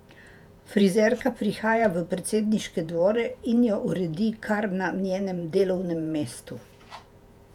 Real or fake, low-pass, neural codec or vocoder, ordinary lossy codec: fake; 19.8 kHz; vocoder, 48 kHz, 128 mel bands, Vocos; none